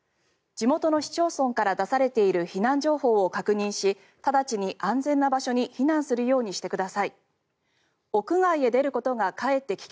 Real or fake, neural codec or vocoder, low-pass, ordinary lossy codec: real; none; none; none